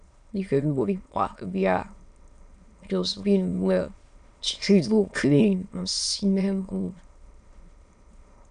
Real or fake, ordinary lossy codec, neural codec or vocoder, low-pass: fake; none; autoencoder, 22.05 kHz, a latent of 192 numbers a frame, VITS, trained on many speakers; 9.9 kHz